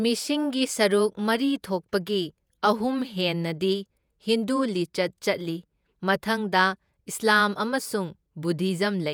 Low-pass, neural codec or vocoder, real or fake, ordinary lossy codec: none; vocoder, 48 kHz, 128 mel bands, Vocos; fake; none